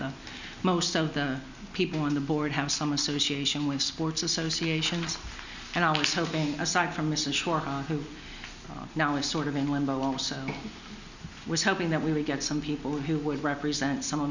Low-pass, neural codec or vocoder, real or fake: 7.2 kHz; none; real